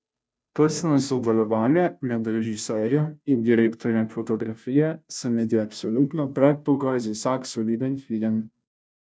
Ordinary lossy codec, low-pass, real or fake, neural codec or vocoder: none; none; fake; codec, 16 kHz, 0.5 kbps, FunCodec, trained on Chinese and English, 25 frames a second